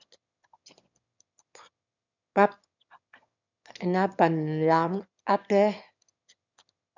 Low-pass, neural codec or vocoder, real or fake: 7.2 kHz; autoencoder, 22.05 kHz, a latent of 192 numbers a frame, VITS, trained on one speaker; fake